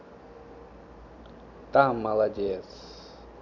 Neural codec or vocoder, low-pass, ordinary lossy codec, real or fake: none; 7.2 kHz; none; real